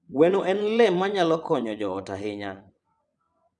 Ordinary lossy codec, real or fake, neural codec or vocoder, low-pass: none; fake; vocoder, 22.05 kHz, 80 mel bands, WaveNeXt; 9.9 kHz